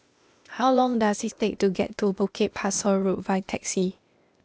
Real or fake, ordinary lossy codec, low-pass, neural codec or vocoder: fake; none; none; codec, 16 kHz, 0.8 kbps, ZipCodec